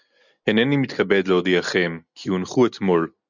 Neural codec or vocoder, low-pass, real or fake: none; 7.2 kHz; real